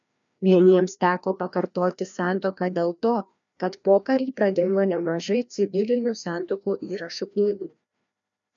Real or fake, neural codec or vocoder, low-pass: fake; codec, 16 kHz, 1 kbps, FreqCodec, larger model; 7.2 kHz